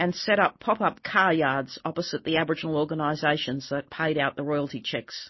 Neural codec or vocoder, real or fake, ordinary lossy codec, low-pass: none; real; MP3, 24 kbps; 7.2 kHz